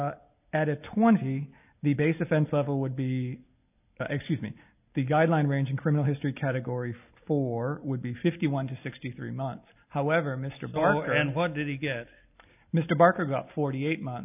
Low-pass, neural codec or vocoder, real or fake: 3.6 kHz; none; real